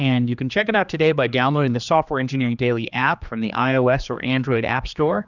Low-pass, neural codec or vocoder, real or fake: 7.2 kHz; codec, 16 kHz, 2 kbps, X-Codec, HuBERT features, trained on general audio; fake